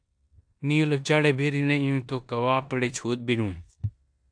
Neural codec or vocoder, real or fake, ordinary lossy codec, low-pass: codec, 16 kHz in and 24 kHz out, 0.9 kbps, LongCat-Audio-Codec, four codebook decoder; fake; AAC, 64 kbps; 9.9 kHz